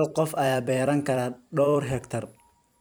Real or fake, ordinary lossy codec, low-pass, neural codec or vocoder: fake; none; none; vocoder, 44.1 kHz, 128 mel bands every 256 samples, BigVGAN v2